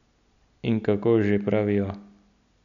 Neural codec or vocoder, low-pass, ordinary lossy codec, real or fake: none; 7.2 kHz; none; real